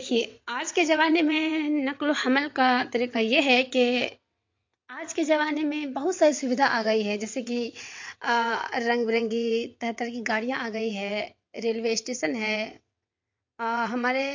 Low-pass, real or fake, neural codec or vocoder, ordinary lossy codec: 7.2 kHz; fake; vocoder, 22.05 kHz, 80 mel bands, WaveNeXt; MP3, 48 kbps